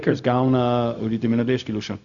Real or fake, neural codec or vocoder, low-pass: fake; codec, 16 kHz, 0.4 kbps, LongCat-Audio-Codec; 7.2 kHz